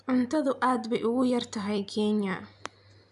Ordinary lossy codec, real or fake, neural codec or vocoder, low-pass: none; real; none; 10.8 kHz